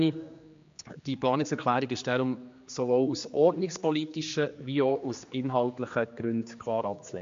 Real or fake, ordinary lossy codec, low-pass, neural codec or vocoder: fake; MP3, 48 kbps; 7.2 kHz; codec, 16 kHz, 2 kbps, X-Codec, HuBERT features, trained on general audio